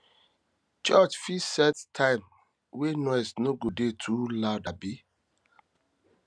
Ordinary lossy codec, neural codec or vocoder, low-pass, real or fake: none; none; none; real